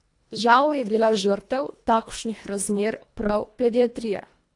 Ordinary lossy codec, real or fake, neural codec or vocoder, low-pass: AAC, 48 kbps; fake; codec, 24 kHz, 1.5 kbps, HILCodec; 10.8 kHz